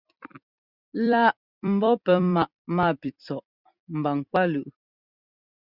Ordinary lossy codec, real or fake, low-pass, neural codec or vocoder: Opus, 64 kbps; fake; 5.4 kHz; vocoder, 44.1 kHz, 128 mel bands every 256 samples, BigVGAN v2